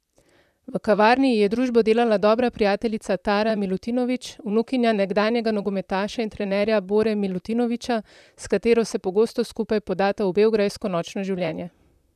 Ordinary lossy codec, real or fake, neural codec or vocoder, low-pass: none; fake; vocoder, 44.1 kHz, 128 mel bands, Pupu-Vocoder; 14.4 kHz